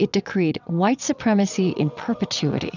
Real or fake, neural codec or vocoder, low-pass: fake; vocoder, 22.05 kHz, 80 mel bands, WaveNeXt; 7.2 kHz